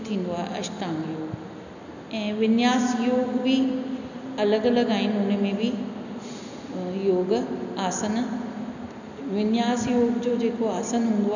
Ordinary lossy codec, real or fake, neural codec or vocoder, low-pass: none; real; none; 7.2 kHz